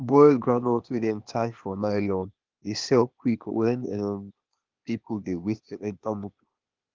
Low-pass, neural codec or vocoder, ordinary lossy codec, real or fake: 7.2 kHz; codec, 16 kHz, 0.8 kbps, ZipCodec; Opus, 32 kbps; fake